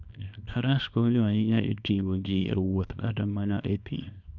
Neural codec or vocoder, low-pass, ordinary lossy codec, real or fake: codec, 24 kHz, 0.9 kbps, WavTokenizer, small release; 7.2 kHz; none; fake